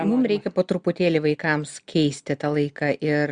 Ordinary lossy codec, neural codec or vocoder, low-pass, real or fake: Opus, 64 kbps; none; 9.9 kHz; real